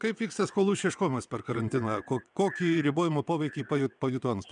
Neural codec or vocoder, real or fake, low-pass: vocoder, 22.05 kHz, 80 mel bands, WaveNeXt; fake; 9.9 kHz